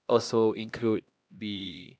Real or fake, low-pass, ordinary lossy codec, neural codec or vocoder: fake; none; none; codec, 16 kHz, 1 kbps, X-Codec, HuBERT features, trained on LibriSpeech